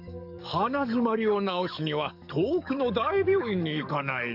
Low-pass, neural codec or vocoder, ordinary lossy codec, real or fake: 5.4 kHz; codec, 44.1 kHz, 7.8 kbps, DAC; none; fake